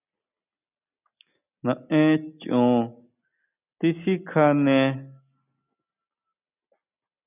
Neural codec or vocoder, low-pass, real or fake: none; 3.6 kHz; real